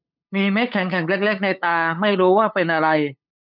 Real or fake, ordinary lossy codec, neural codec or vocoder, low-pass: fake; none; codec, 16 kHz, 8 kbps, FunCodec, trained on LibriTTS, 25 frames a second; 5.4 kHz